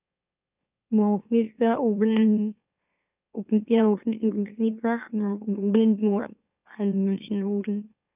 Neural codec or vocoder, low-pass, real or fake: autoencoder, 44.1 kHz, a latent of 192 numbers a frame, MeloTTS; 3.6 kHz; fake